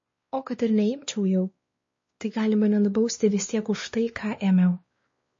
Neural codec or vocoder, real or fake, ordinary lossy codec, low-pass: codec, 16 kHz, 2 kbps, X-Codec, WavLM features, trained on Multilingual LibriSpeech; fake; MP3, 32 kbps; 7.2 kHz